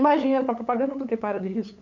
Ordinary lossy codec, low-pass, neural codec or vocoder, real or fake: none; 7.2 kHz; codec, 16 kHz, 8 kbps, FunCodec, trained on LibriTTS, 25 frames a second; fake